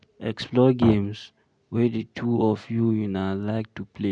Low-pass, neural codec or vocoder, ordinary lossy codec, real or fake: 9.9 kHz; none; none; real